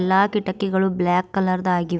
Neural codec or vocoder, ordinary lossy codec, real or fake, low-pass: none; none; real; none